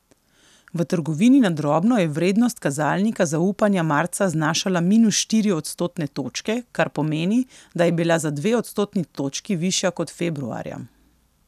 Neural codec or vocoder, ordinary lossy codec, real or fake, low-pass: none; none; real; 14.4 kHz